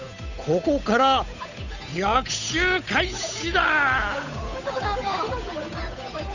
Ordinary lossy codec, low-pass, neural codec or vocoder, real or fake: none; 7.2 kHz; codec, 16 kHz, 8 kbps, FunCodec, trained on Chinese and English, 25 frames a second; fake